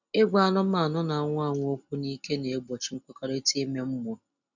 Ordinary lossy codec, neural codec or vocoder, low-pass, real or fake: none; none; 7.2 kHz; real